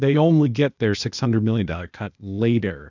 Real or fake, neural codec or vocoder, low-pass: fake; codec, 16 kHz, 0.8 kbps, ZipCodec; 7.2 kHz